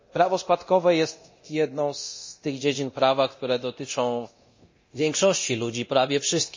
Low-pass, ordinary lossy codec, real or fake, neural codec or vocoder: 7.2 kHz; MP3, 32 kbps; fake; codec, 24 kHz, 0.9 kbps, DualCodec